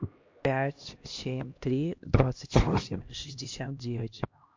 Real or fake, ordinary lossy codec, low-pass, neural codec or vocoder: fake; MP3, 48 kbps; 7.2 kHz; codec, 16 kHz, 1 kbps, X-Codec, HuBERT features, trained on LibriSpeech